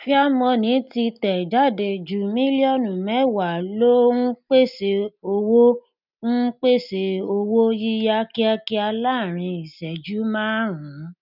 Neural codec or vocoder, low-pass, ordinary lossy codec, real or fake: none; 5.4 kHz; none; real